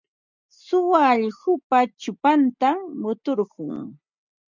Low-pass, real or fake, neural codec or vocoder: 7.2 kHz; real; none